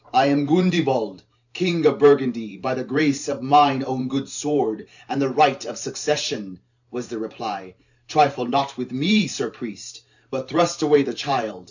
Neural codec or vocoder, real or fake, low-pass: vocoder, 44.1 kHz, 128 mel bands every 512 samples, BigVGAN v2; fake; 7.2 kHz